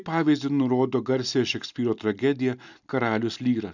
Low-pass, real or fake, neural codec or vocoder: 7.2 kHz; real; none